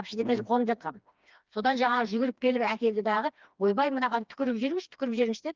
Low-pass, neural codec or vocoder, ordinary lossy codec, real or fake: 7.2 kHz; codec, 16 kHz, 2 kbps, FreqCodec, smaller model; Opus, 24 kbps; fake